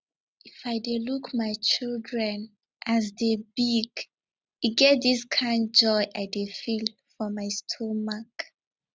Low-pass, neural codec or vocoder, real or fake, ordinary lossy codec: none; none; real; none